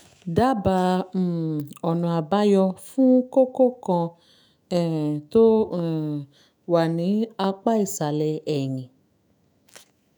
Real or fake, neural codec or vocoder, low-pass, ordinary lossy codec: fake; autoencoder, 48 kHz, 128 numbers a frame, DAC-VAE, trained on Japanese speech; none; none